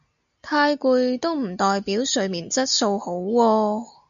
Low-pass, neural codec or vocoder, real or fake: 7.2 kHz; none; real